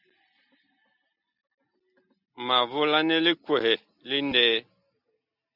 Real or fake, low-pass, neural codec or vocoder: real; 5.4 kHz; none